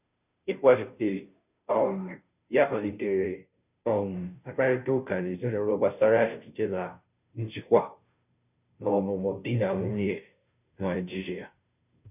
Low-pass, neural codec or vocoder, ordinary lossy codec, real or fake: 3.6 kHz; codec, 16 kHz, 0.5 kbps, FunCodec, trained on Chinese and English, 25 frames a second; none; fake